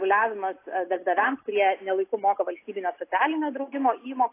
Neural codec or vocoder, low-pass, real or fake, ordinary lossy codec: none; 3.6 kHz; real; AAC, 24 kbps